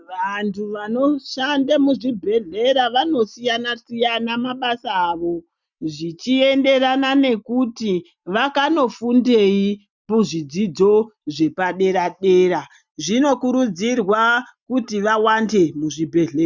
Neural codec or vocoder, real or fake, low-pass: none; real; 7.2 kHz